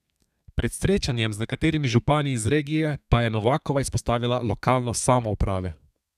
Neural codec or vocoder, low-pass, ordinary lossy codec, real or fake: codec, 32 kHz, 1.9 kbps, SNAC; 14.4 kHz; none; fake